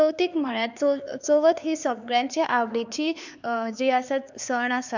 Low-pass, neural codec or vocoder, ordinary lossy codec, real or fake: 7.2 kHz; codec, 16 kHz, 4 kbps, X-Codec, HuBERT features, trained on LibriSpeech; none; fake